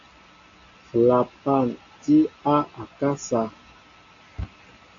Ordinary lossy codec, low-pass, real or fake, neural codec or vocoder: AAC, 64 kbps; 7.2 kHz; real; none